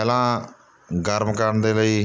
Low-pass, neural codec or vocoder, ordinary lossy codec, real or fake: none; none; none; real